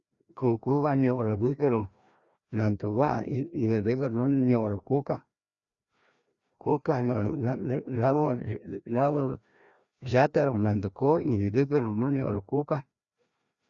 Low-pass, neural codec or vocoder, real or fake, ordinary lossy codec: 7.2 kHz; codec, 16 kHz, 1 kbps, FreqCodec, larger model; fake; Opus, 64 kbps